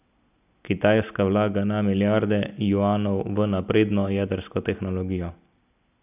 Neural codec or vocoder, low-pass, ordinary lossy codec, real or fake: none; 3.6 kHz; none; real